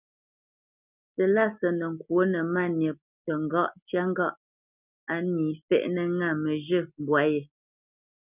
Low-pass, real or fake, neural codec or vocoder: 3.6 kHz; real; none